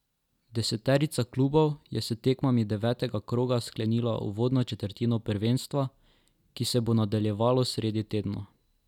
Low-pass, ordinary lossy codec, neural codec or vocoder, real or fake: 19.8 kHz; none; none; real